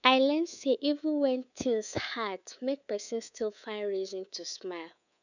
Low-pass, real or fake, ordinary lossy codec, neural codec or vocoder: 7.2 kHz; fake; none; codec, 24 kHz, 3.1 kbps, DualCodec